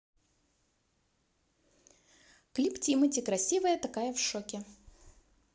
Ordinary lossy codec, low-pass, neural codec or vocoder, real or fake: none; none; none; real